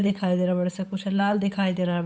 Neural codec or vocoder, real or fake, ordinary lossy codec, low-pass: codec, 16 kHz, 8 kbps, FunCodec, trained on Chinese and English, 25 frames a second; fake; none; none